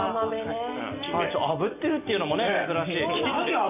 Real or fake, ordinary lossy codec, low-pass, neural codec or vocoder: real; none; 3.6 kHz; none